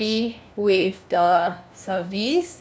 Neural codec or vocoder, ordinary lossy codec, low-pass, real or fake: codec, 16 kHz, 1 kbps, FunCodec, trained on LibriTTS, 50 frames a second; none; none; fake